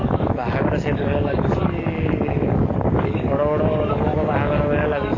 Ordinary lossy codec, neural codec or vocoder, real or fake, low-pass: AAC, 32 kbps; codec, 44.1 kHz, 7.8 kbps, DAC; fake; 7.2 kHz